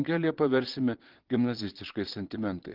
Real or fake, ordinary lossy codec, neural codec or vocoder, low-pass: fake; Opus, 16 kbps; vocoder, 22.05 kHz, 80 mel bands, Vocos; 5.4 kHz